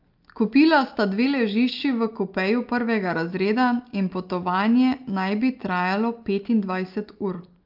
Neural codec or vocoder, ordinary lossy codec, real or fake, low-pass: none; Opus, 32 kbps; real; 5.4 kHz